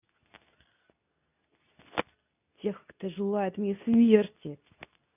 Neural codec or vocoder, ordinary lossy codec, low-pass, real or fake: codec, 24 kHz, 0.9 kbps, WavTokenizer, medium speech release version 2; none; 3.6 kHz; fake